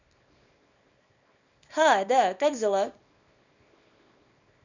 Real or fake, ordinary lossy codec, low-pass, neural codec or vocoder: fake; none; 7.2 kHz; codec, 24 kHz, 0.9 kbps, WavTokenizer, small release